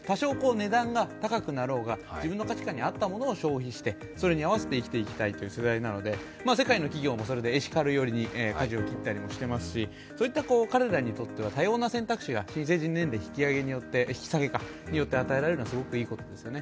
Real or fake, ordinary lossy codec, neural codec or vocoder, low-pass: real; none; none; none